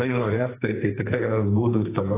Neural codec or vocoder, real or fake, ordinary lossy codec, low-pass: codec, 44.1 kHz, 2.6 kbps, SNAC; fake; AAC, 16 kbps; 3.6 kHz